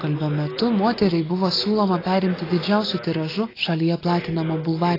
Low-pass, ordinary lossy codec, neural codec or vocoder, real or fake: 5.4 kHz; AAC, 24 kbps; none; real